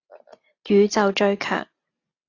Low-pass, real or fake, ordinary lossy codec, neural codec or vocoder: 7.2 kHz; real; AAC, 32 kbps; none